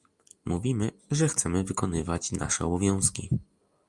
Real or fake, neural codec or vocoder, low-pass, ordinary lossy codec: real; none; 10.8 kHz; Opus, 32 kbps